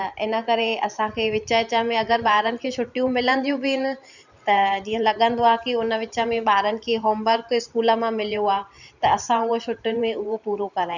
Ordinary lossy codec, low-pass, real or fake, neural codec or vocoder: none; 7.2 kHz; fake; vocoder, 44.1 kHz, 128 mel bands every 512 samples, BigVGAN v2